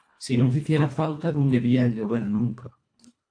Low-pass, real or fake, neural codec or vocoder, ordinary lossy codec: 9.9 kHz; fake; codec, 24 kHz, 1.5 kbps, HILCodec; AAC, 48 kbps